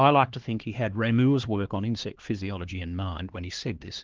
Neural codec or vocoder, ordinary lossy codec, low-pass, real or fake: codec, 16 kHz, 2 kbps, X-Codec, HuBERT features, trained on LibriSpeech; Opus, 16 kbps; 7.2 kHz; fake